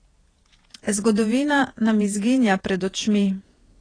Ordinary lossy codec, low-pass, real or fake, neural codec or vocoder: AAC, 32 kbps; 9.9 kHz; fake; vocoder, 48 kHz, 128 mel bands, Vocos